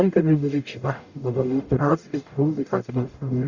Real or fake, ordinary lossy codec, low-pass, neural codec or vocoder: fake; Opus, 64 kbps; 7.2 kHz; codec, 44.1 kHz, 0.9 kbps, DAC